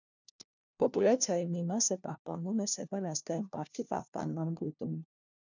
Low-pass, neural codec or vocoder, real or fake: 7.2 kHz; codec, 16 kHz, 1 kbps, FunCodec, trained on LibriTTS, 50 frames a second; fake